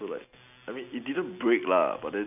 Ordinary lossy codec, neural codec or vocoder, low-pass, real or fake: none; none; 3.6 kHz; real